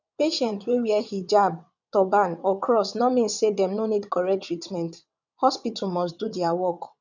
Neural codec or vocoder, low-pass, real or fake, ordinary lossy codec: vocoder, 22.05 kHz, 80 mel bands, WaveNeXt; 7.2 kHz; fake; none